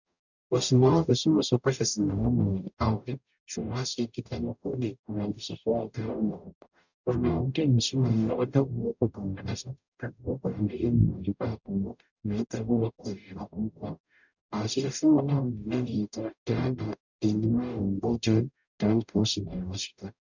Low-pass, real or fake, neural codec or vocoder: 7.2 kHz; fake; codec, 44.1 kHz, 0.9 kbps, DAC